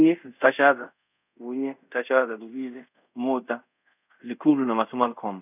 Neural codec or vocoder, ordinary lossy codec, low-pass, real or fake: codec, 24 kHz, 0.5 kbps, DualCodec; none; 3.6 kHz; fake